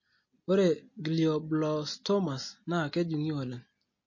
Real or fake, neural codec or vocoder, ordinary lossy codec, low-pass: real; none; MP3, 32 kbps; 7.2 kHz